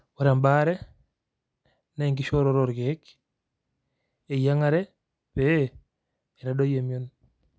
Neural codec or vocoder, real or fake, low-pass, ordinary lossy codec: none; real; none; none